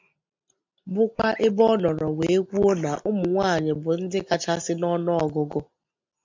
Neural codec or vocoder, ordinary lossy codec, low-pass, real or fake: none; MP3, 64 kbps; 7.2 kHz; real